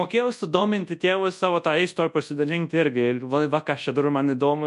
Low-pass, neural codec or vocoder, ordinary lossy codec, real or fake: 10.8 kHz; codec, 24 kHz, 0.9 kbps, WavTokenizer, large speech release; MP3, 64 kbps; fake